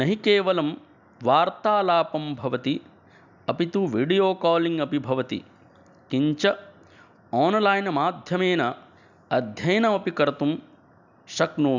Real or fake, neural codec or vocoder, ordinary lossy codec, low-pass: real; none; none; 7.2 kHz